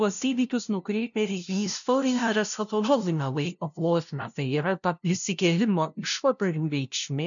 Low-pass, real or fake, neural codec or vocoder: 7.2 kHz; fake; codec, 16 kHz, 0.5 kbps, FunCodec, trained on LibriTTS, 25 frames a second